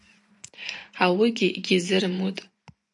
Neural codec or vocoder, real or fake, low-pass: vocoder, 24 kHz, 100 mel bands, Vocos; fake; 10.8 kHz